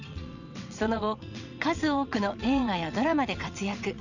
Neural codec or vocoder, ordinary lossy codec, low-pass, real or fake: vocoder, 22.05 kHz, 80 mel bands, WaveNeXt; none; 7.2 kHz; fake